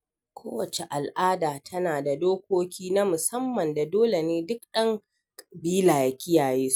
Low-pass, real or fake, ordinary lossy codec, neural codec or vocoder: none; real; none; none